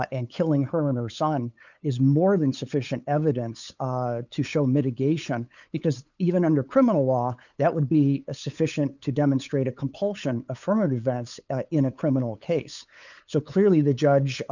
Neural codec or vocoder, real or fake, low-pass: codec, 16 kHz, 8 kbps, FunCodec, trained on LibriTTS, 25 frames a second; fake; 7.2 kHz